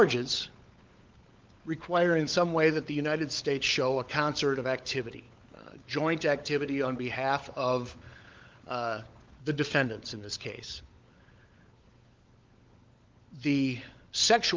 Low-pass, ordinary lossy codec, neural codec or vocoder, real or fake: 7.2 kHz; Opus, 32 kbps; codec, 16 kHz, 16 kbps, FunCodec, trained on LibriTTS, 50 frames a second; fake